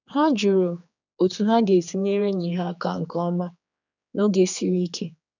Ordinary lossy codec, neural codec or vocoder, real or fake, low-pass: none; codec, 16 kHz, 4 kbps, X-Codec, HuBERT features, trained on general audio; fake; 7.2 kHz